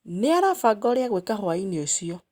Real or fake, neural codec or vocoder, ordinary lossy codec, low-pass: real; none; Opus, 64 kbps; 19.8 kHz